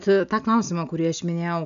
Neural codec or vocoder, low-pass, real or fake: none; 7.2 kHz; real